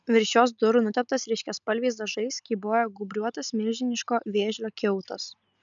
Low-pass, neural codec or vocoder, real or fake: 7.2 kHz; none; real